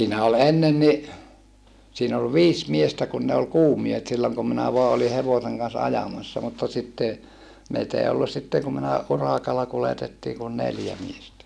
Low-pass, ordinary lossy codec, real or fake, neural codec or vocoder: none; none; real; none